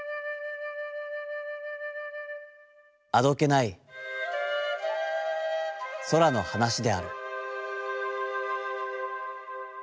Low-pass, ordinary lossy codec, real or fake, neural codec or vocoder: none; none; real; none